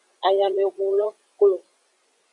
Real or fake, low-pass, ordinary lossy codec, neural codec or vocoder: fake; 10.8 kHz; Opus, 64 kbps; vocoder, 24 kHz, 100 mel bands, Vocos